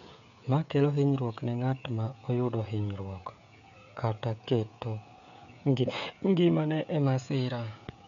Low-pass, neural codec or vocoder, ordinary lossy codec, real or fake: 7.2 kHz; codec, 16 kHz, 16 kbps, FreqCodec, smaller model; none; fake